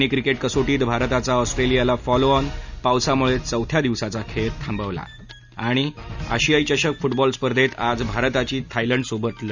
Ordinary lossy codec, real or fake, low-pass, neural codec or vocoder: none; real; 7.2 kHz; none